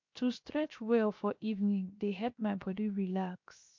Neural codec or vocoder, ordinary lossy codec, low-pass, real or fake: codec, 16 kHz, 0.7 kbps, FocalCodec; MP3, 48 kbps; 7.2 kHz; fake